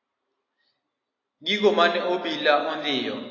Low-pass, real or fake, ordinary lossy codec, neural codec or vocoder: 7.2 kHz; real; AAC, 32 kbps; none